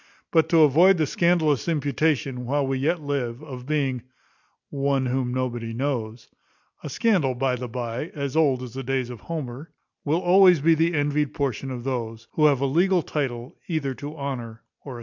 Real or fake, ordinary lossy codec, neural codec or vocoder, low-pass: real; MP3, 64 kbps; none; 7.2 kHz